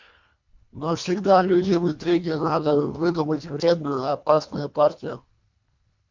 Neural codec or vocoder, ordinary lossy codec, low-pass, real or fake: codec, 24 kHz, 1.5 kbps, HILCodec; MP3, 64 kbps; 7.2 kHz; fake